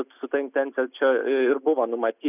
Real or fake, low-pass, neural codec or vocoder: real; 3.6 kHz; none